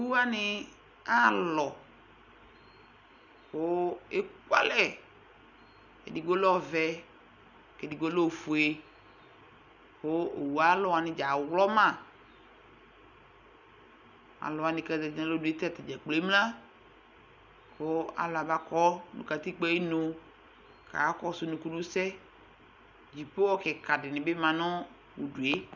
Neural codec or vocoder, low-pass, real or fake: none; 7.2 kHz; real